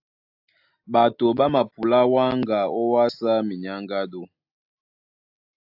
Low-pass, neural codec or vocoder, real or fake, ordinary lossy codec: 5.4 kHz; none; real; AAC, 48 kbps